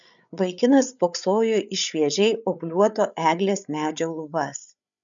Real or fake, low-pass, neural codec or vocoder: fake; 7.2 kHz; codec, 16 kHz, 8 kbps, FreqCodec, larger model